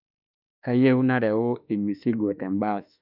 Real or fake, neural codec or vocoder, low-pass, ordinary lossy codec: fake; autoencoder, 48 kHz, 32 numbers a frame, DAC-VAE, trained on Japanese speech; 5.4 kHz; none